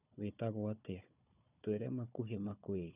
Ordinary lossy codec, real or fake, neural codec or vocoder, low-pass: none; fake; codec, 16 kHz, 4 kbps, FunCodec, trained on Chinese and English, 50 frames a second; 3.6 kHz